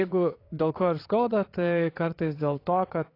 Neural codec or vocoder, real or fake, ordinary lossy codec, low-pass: vocoder, 22.05 kHz, 80 mel bands, WaveNeXt; fake; AAC, 32 kbps; 5.4 kHz